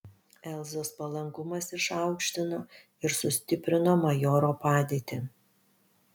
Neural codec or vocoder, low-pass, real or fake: none; 19.8 kHz; real